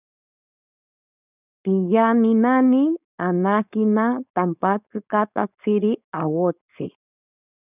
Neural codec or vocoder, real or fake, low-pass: codec, 16 kHz, 4.8 kbps, FACodec; fake; 3.6 kHz